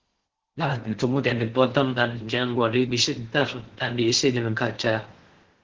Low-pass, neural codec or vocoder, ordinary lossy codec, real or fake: 7.2 kHz; codec, 16 kHz in and 24 kHz out, 0.6 kbps, FocalCodec, streaming, 4096 codes; Opus, 16 kbps; fake